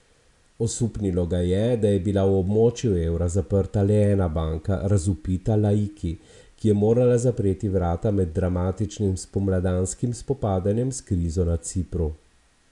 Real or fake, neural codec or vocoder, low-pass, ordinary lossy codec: real; none; 10.8 kHz; none